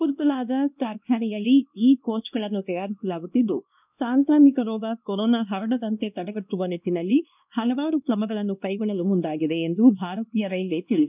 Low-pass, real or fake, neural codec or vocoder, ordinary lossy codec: 3.6 kHz; fake; codec, 16 kHz, 1 kbps, X-Codec, WavLM features, trained on Multilingual LibriSpeech; none